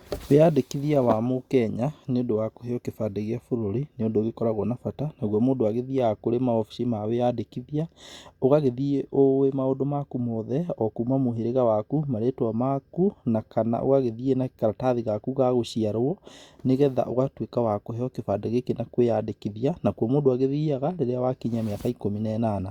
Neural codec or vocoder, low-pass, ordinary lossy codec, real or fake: none; 19.8 kHz; Opus, 64 kbps; real